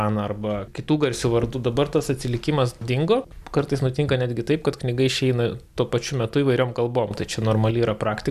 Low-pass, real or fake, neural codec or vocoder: 14.4 kHz; real; none